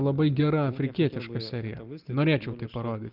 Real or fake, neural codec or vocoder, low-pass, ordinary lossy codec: real; none; 5.4 kHz; Opus, 32 kbps